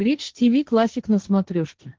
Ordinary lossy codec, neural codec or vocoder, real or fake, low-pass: Opus, 16 kbps; codec, 16 kHz, 1.1 kbps, Voila-Tokenizer; fake; 7.2 kHz